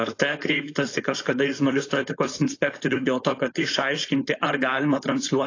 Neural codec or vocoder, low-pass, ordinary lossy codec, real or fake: codec, 16 kHz, 4.8 kbps, FACodec; 7.2 kHz; AAC, 32 kbps; fake